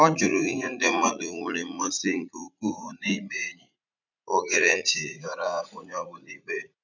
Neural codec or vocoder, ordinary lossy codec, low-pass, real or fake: vocoder, 44.1 kHz, 80 mel bands, Vocos; none; 7.2 kHz; fake